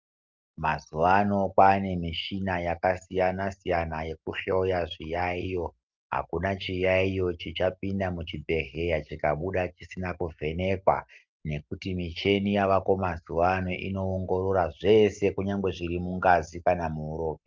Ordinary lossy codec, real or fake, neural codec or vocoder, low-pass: Opus, 24 kbps; real; none; 7.2 kHz